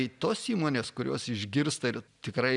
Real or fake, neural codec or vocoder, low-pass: real; none; 10.8 kHz